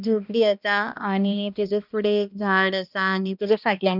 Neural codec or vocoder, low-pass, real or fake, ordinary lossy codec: codec, 16 kHz, 1 kbps, X-Codec, HuBERT features, trained on balanced general audio; 5.4 kHz; fake; none